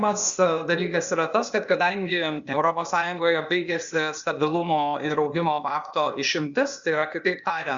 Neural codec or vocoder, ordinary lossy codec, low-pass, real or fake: codec, 16 kHz, 0.8 kbps, ZipCodec; Opus, 64 kbps; 7.2 kHz; fake